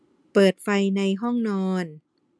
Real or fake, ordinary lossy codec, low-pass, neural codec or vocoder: real; none; none; none